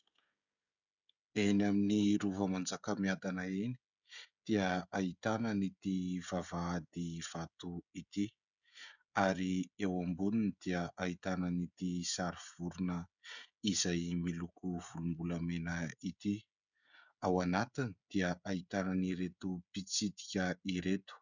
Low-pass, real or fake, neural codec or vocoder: 7.2 kHz; fake; codec, 16 kHz, 16 kbps, FreqCodec, smaller model